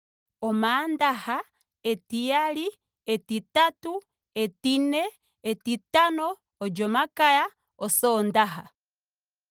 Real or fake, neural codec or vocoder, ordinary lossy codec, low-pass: real; none; Opus, 24 kbps; 19.8 kHz